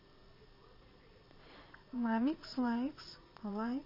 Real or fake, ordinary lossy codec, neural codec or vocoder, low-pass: fake; MP3, 24 kbps; vocoder, 22.05 kHz, 80 mel bands, Vocos; 5.4 kHz